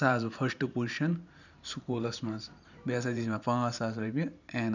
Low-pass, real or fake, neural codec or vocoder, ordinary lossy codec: 7.2 kHz; fake; vocoder, 44.1 kHz, 128 mel bands every 512 samples, BigVGAN v2; none